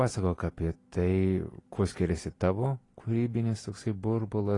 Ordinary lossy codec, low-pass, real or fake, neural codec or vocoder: AAC, 32 kbps; 10.8 kHz; real; none